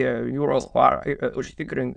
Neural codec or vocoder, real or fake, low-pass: autoencoder, 22.05 kHz, a latent of 192 numbers a frame, VITS, trained on many speakers; fake; 9.9 kHz